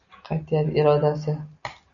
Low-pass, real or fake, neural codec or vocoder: 7.2 kHz; real; none